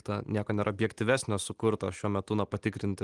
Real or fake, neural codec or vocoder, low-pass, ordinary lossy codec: real; none; 10.8 kHz; Opus, 24 kbps